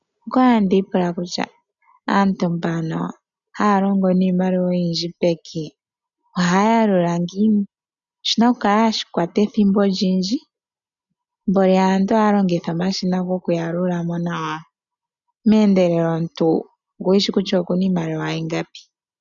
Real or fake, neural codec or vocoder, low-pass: real; none; 7.2 kHz